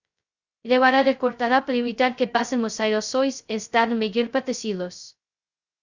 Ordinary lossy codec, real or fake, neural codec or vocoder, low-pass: Opus, 64 kbps; fake; codec, 16 kHz, 0.2 kbps, FocalCodec; 7.2 kHz